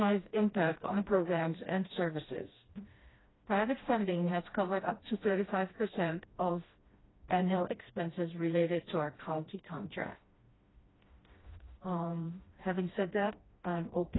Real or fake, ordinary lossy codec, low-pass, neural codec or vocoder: fake; AAC, 16 kbps; 7.2 kHz; codec, 16 kHz, 1 kbps, FreqCodec, smaller model